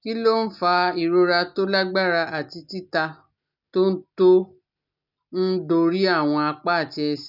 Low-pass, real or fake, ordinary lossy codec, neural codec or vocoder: 5.4 kHz; real; none; none